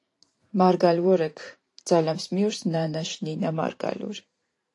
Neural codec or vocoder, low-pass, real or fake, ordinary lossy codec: vocoder, 44.1 kHz, 128 mel bands every 512 samples, BigVGAN v2; 10.8 kHz; fake; AAC, 48 kbps